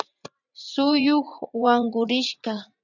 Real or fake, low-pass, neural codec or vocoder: fake; 7.2 kHz; vocoder, 44.1 kHz, 80 mel bands, Vocos